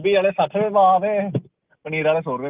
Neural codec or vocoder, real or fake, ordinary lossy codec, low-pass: none; real; Opus, 32 kbps; 3.6 kHz